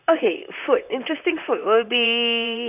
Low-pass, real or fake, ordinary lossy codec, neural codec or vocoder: 3.6 kHz; fake; none; vocoder, 44.1 kHz, 128 mel bands, Pupu-Vocoder